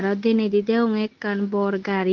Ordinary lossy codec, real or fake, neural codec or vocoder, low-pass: Opus, 16 kbps; real; none; 7.2 kHz